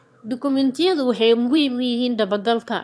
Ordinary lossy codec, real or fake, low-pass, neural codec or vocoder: none; fake; none; autoencoder, 22.05 kHz, a latent of 192 numbers a frame, VITS, trained on one speaker